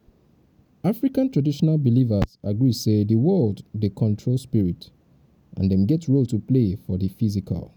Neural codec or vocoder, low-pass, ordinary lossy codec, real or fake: none; 19.8 kHz; none; real